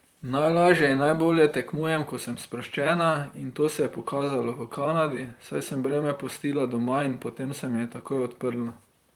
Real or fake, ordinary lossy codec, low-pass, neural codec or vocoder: fake; Opus, 32 kbps; 19.8 kHz; vocoder, 44.1 kHz, 128 mel bands, Pupu-Vocoder